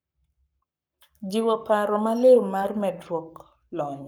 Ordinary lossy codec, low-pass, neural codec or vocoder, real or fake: none; none; codec, 44.1 kHz, 7.8 kbps, Pupu-Codec; fake